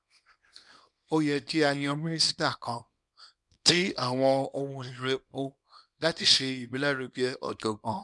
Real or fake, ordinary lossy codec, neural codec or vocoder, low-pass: fake; none; codec, 24 kHz, 0.9 kbps, WavTokenizer, small release; 10.8 kHz